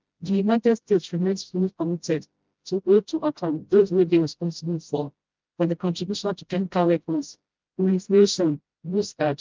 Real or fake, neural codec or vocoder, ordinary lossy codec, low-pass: fake; codec, 16 kHz, 0.5 kbps, FreqCodec, smaller model; Opus, 24 kbps; 7.2 kHz